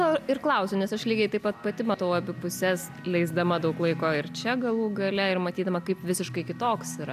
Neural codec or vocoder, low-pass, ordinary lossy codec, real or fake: none; 14.4 kHz; AAC, 96 kbps; real